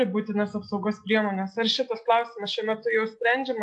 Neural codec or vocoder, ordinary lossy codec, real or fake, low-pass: none; AAC, 64 kbps; real; 10.8 kHz